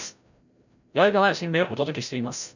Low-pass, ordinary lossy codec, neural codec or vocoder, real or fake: 7.2 kHz; none; codec, 16 kHz, 0.5 kbps, FreqCodec, larger model; fake